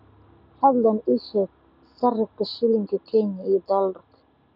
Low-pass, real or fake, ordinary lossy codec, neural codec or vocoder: 5.4 kHz; real; none; none